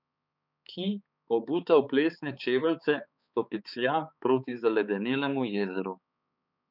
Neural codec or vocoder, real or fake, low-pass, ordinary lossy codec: codec, 16 kHz, 4 kbps, X-Codec, HuBERT features, trained on balanced general audio; fake; 5.4 kHz; none